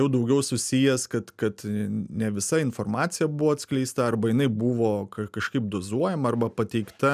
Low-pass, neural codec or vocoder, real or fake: 14.4 kHz; none; real